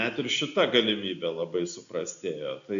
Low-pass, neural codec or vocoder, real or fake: 7.2 kHz; none; real